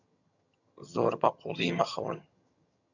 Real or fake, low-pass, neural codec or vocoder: fake; 7.2 kHz; vocoder, 22.05 kHz, 80 mel bands, HiFi-GAN